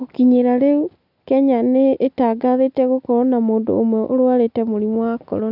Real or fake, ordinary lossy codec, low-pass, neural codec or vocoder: real; none; 5.4 kHz; none